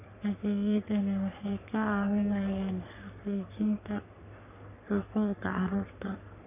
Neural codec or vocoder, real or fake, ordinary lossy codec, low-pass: codec, 44.1 kHz, 3.4 kbps, Pupu-Codec; fake; none; 3.6 kHz